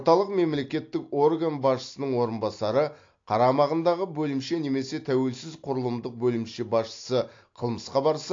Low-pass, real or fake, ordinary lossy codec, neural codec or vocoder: 7.2 kHz; real; AAC, 48 kbps; none